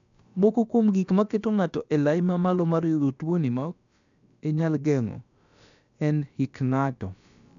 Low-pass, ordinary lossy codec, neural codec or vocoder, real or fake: 7.2 kHz; none; codec, 16 kHz, about 1 kbps, DyCAST, with the encoder's durations; fake